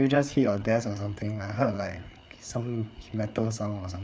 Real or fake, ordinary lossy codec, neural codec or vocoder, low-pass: fake; none; codec, 16 kHz, 4 kbps, FreqCodec, larger model; none